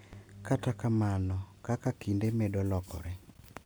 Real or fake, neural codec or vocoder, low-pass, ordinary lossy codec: real; none; none; none